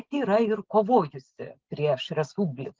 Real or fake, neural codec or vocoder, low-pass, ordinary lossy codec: real; none; 7.2 kHz; Opus, 16 kbps